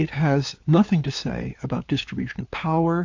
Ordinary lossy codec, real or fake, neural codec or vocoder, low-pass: AAC, 48 kbps; fake; codec, 16 kHz, 4 kbps, FunCodec, trained on LibriTTS, 50 frames a second; 7.2 kHz